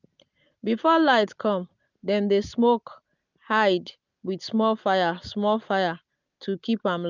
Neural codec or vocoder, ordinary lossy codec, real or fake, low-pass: none; none; real; 7.2 kHz